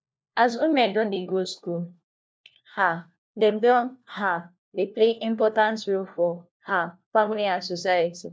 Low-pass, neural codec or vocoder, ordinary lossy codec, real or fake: none; codec, 16 kHz, 1 kbps, FunCodec, trained on LibriTTS, 50 frames a second; none; fake